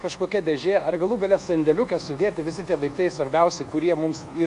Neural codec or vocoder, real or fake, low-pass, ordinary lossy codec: codec, 24 kHz, 1.2 kbps, DualCodec; fake; 10.8 kHz; MP3, 64 kbps